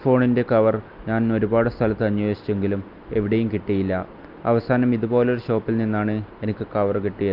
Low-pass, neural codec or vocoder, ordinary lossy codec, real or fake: 5.4 kHz; none; Opus, 24 kbps; real